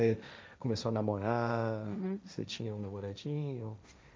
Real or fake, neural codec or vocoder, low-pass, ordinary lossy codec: fake; codec, 16 kHz, 1.1 kbps, Voila-Tokenizer; none; none